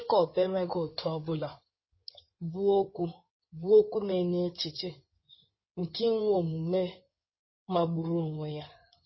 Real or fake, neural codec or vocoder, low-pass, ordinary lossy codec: fake; codec, 16 kHz in and 24 kHz out, 2.2 kbps, FireRedTTS-2 codec; 7.2 kHz; MP3, 24 kbps